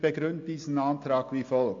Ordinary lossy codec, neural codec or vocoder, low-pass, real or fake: AAC, 48 kbps; none; 7.2 kHz; real